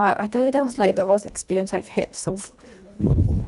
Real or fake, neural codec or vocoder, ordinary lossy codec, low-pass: fake; codec, 24 kHz, 1.5 kbps, HILCodec; none; 10.8 kHz